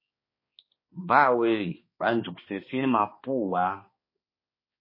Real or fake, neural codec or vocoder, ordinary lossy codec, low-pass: fake; codec, 16 kHz, 2 kbps, X-Codec, HuBERT features, trained on balanced general audio; MP3, 24 kbps; 5.4 kHz